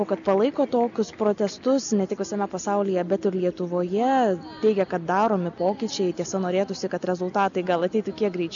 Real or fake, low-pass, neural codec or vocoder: real; 7.2 kHz; none